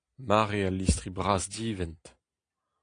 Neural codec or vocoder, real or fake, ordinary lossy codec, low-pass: none; real; AAC, 48 kbps; 9.9 kHz